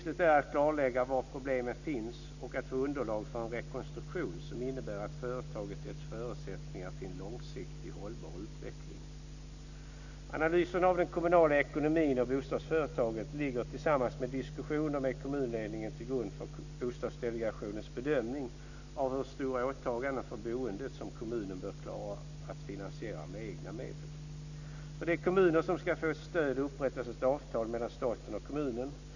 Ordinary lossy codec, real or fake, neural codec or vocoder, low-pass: none; real; none; 7.2 kHz